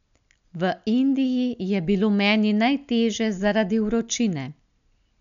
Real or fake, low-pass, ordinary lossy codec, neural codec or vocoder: real; 7.2 kHz; none; none